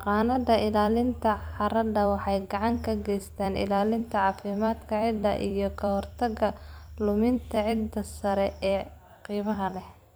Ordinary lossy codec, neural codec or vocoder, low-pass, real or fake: none; vocoder, 44.1 kHz, 128 mel bands every 256 samples, BigVGAN v2; none; fake